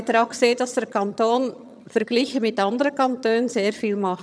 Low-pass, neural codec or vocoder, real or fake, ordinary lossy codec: none; vocoder, 22.05 kHz, 80 mel bands, HiFi-GAN; fake; none